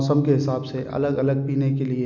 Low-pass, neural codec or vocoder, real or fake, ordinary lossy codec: 7.2 kHz; none; real; none